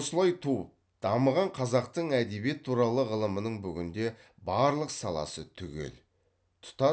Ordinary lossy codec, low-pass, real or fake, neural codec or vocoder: none; none; real; none